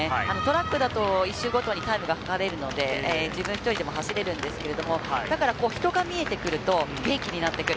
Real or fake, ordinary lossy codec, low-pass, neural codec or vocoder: real; none; none; none